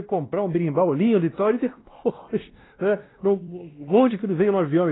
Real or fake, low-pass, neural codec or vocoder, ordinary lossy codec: fake; 7.2 kHz; codec, 16 kHz, 1 kbps, X-Codec, WavLM features, trained on Multilingual LibriSpeech; AAC, 16 kbps